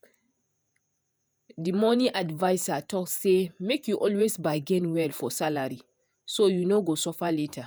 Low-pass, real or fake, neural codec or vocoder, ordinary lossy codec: none; fake; vocoder, 48 kHz, 128 mel bands, Vocos; none